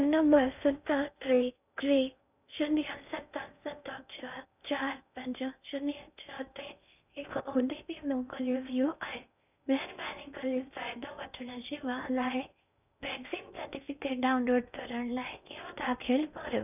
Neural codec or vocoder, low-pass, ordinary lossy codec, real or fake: codec, 16 kHz in and 24 kHz out, 0.6 kbps, FocalCodec, streaming, 4096 codes; 3.6 kHz; none; fake